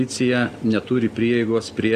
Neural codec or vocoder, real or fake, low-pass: none; real; 14.4 kHz